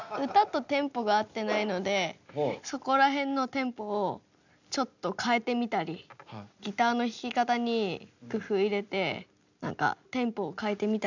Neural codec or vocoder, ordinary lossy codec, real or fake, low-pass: none; none; real; 7.2 kHz